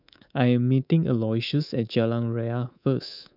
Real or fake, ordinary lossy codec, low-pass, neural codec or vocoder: fake; none; 5.4 kHz; codec, 24 kHz, 3.1 kbps, DualCodec